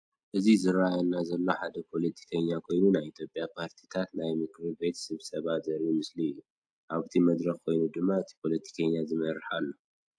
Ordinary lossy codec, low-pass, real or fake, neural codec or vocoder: AAC, 64 kbps; 9.9 kHz; real; none